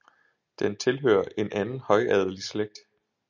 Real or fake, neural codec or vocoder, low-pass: real; none; 7.2 kHz